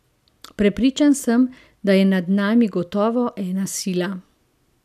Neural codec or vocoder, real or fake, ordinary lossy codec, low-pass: none; real; none; 14.4 kHz